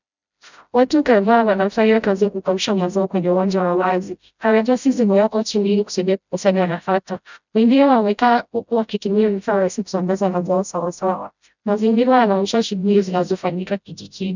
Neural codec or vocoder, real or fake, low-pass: codec, 16 kHz, 0.5 kbps, FreqCodec, smaller model; fake; 7.2 kHz